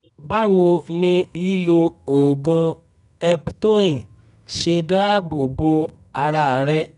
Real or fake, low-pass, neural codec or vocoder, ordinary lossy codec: fake; 10.8 kHz; codec, 24 kHz, 0.9 kbps, WavTokenizer, medium music audio release; none